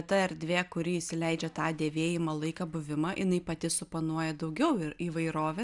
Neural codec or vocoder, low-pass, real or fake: none; 10.8 kHz; real